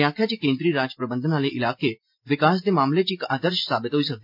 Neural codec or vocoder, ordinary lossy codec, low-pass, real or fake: none; MP3, 48 kbps; 5.4 kHz; real